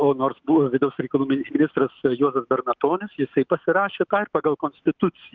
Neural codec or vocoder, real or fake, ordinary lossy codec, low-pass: autoencoder, 48 kHz, 128 numbers a frame, DAC-VAE, trained on Japanese speech; fake; Opus, 24 kbps; 7.2 kHz